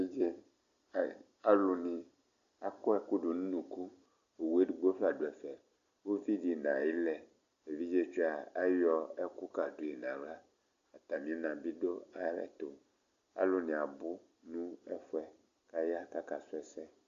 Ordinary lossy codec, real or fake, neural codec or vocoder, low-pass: Opus, 64 kbps; real; none; 7.2 kHz